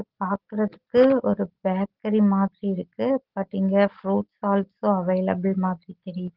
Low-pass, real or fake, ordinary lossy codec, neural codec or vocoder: 5.4 kHz; real; Opus, 16 kbps; none